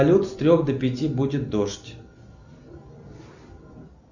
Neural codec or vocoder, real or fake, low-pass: none; real; 7.2 kHz